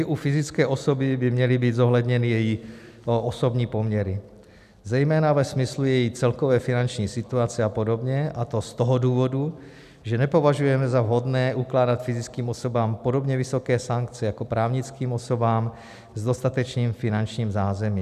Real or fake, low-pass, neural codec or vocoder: real; 14.4 kHz; none